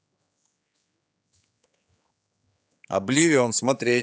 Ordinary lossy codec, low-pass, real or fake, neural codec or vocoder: none; none; fake; codec, 16 kHz, 4 kbps, X-Codec, HuBERT features, trained on general audio